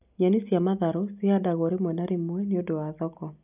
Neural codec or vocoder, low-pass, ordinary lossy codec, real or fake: none; 3.6 kHz; none; real